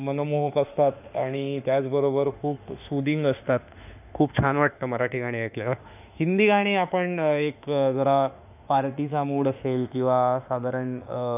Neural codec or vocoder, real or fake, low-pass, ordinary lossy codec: autoencoder, 48 kHz, 32 numbers a frame, DAC-VAE, trained on Japanese speech; fake; 3.6 kHz; none